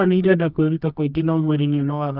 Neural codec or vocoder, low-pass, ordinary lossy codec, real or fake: codec, 24 kHz, 0.9 kbps, WavTokenizer, medium music audio release; 5.4 kHz; none; fake